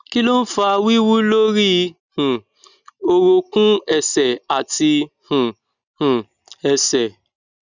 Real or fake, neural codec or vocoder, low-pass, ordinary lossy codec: real; none; 7.2 kHz; none